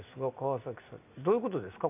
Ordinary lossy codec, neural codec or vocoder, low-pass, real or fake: none; none; 3.6 kHz; real